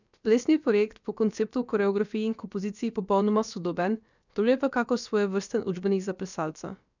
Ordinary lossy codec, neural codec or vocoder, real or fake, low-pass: none; codec, 16 kHz, about 1 kbps, DyCAST, with the encoder's durations; fake; 7.2 kHz